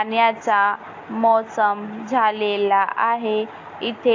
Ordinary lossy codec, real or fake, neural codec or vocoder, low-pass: AAC, 48 kbps; real; none; 7.2 kHz